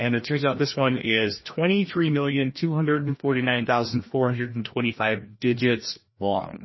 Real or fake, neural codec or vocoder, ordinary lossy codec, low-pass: fake; codec, 16 kHz, 1 kbps, FreqCodec, larger model; MP3, 24 kbps; 7.2 kHz